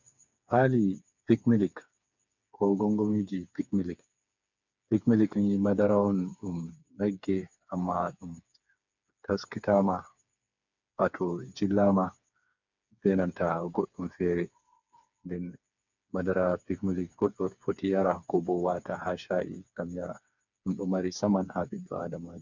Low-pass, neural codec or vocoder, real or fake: 7.2 kHz; codec, 16 kHz, 4 kbps, FreqCodec, smaller model; fake